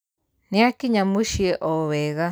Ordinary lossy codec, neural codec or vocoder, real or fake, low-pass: none; none; real; none